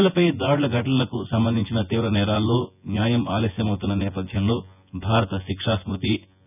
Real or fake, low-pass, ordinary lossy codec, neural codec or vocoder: fake; 3.6 kHz; none; vocoder, 24 kHz, 100 mel bands, Vocos